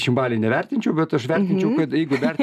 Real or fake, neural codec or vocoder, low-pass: real; none; 14.4 kHz